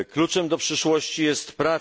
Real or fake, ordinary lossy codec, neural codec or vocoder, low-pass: real; none; none; none